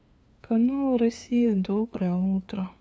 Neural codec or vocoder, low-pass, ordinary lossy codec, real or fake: codec, 16 kHz, 2 kbps, FunCodec, trained on LibriTTS, 25 frames a second; none; none; fake